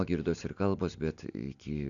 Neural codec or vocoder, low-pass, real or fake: none; 7.2 kHz; real